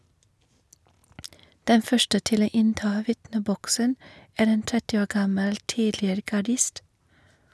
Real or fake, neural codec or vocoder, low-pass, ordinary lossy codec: real; none; none; none